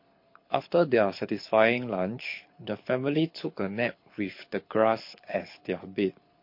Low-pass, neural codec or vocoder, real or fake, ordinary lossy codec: 5.4 kHz; codec, 24 kHz, 6 kbps, HILCodec; fake; MP3, 32 kbps